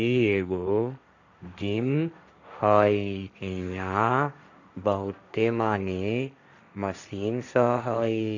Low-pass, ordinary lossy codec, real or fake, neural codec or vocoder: 7.2 kHz; none; fake; codec, 16 kHz, 1.1 kbps, Voila-Tokenizer